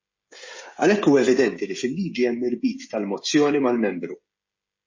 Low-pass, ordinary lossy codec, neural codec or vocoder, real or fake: 7.2 kHz; MP3, 32 kbps; codec, 16 kHz, 16 kbps, FreqCodec, smaller model; fake